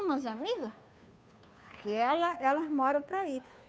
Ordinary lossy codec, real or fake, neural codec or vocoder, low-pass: none; fake; codec, 16 kHz, 2 kbps, FunCodec, trained on Chinese and English, 25 frames a second; none